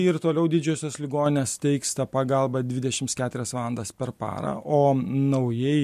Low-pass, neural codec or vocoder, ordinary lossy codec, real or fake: 14.4 kHz; none; MP3, 64 kbps; real